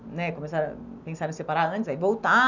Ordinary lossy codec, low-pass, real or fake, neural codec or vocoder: none; 7.2 kHz; real; none